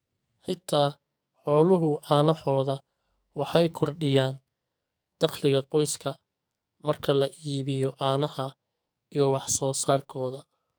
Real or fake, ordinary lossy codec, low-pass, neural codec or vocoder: fake; none; none; codec, 44.1 kHz, 2.6 kbps, SNAC